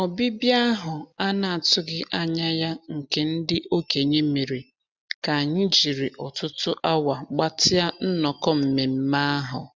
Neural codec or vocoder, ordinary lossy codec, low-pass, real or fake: none; none; none; real